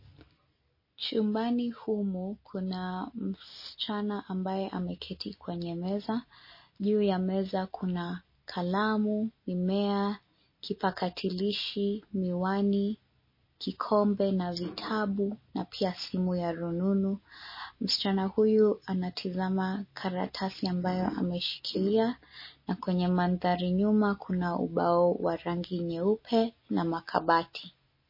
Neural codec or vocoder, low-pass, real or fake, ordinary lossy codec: none; 5.4 kHz; real; MP3, 24 kbps